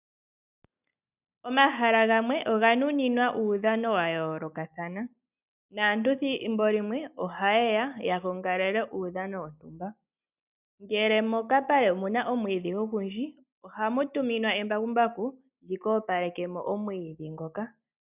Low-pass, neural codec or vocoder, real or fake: 3.6 kHz; none; real